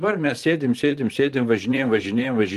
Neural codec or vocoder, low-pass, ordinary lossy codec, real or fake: vocoder, 44.1 kHz, 128 mel bands, Pupu-Vocoder; 14.4 kHz; Opus, 24 kbps; fake